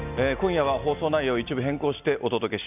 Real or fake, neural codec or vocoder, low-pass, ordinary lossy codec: real; none; 3.6 kHz; none